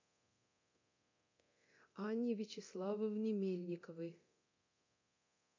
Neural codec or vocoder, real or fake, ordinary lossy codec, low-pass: codec, 24 kHz, 0.9 kbps, DualCodec; fake; AAC, 48 kbps; 7.2 kHz